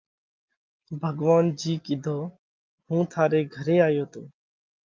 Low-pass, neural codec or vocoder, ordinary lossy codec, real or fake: 7.2 kHz; none; Opus, 32 kbps; real